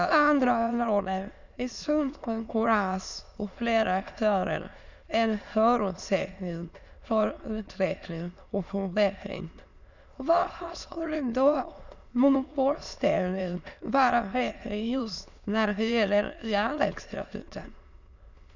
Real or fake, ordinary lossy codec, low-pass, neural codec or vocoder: fake; none; 7.2 kHz; autoencoder, 22.05 kHz, a latent of 192 numbers a frame, VITS, trained on many speakers